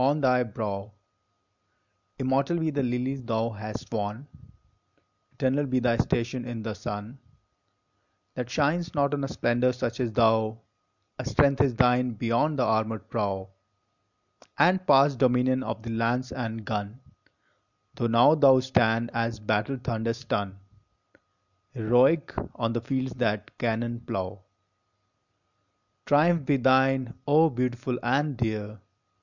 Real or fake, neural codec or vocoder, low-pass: real; none; 7.2 kHz